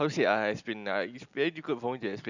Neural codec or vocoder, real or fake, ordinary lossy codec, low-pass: none; real; none; 7.2 kHz